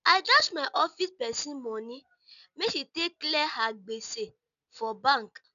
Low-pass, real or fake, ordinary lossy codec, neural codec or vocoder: 7.2 kHz; real; none; none